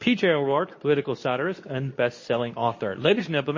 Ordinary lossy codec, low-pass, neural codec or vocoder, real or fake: MP3, 32 kbps; 7.2 kHz; codec, 24 kHz, 0.9 kbps, WavTokenizer, medium speech release version 2; fake